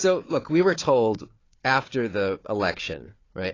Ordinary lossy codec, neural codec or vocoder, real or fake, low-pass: AAC, 32 kbps; codec, 16 kHz, 4 kbps, FunCodec, trained on Chinese and English, 50 frames a second; fake; 7.2 kHz